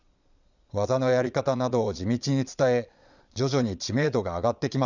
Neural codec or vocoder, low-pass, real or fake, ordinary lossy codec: vocoder, 22.05 kHz, 80 mel bands, Vocos; 7.2 kHz; fake; none